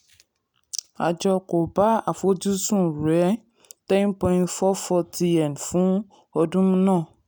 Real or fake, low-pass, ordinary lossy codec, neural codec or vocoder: real; none; none; none